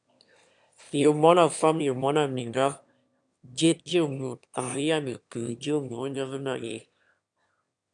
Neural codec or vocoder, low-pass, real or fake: autoencoder, 22.05 kHz, a latent of 192 numbers a frame, VITS, trained on one speaker; 9.9 kHz; fake